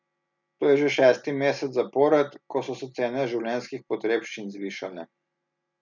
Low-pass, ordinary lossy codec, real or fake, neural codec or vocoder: 7.2 kHz; none; real; none